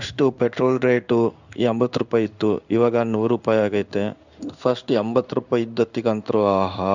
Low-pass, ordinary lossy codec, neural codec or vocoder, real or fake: 7.2 kHz; none; codec, 16 kHz in and 24 kHz out, 1 kbps, XY-Tokenizer; fake